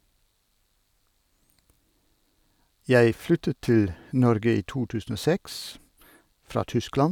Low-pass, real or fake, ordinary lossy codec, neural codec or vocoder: 19.8 kHz; real; none; none